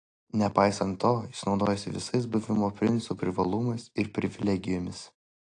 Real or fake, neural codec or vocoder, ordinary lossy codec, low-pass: real; none; AAC, 48 kbps; 9.9 kHz